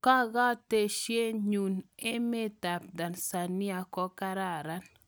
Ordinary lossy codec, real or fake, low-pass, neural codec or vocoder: none; real; none; none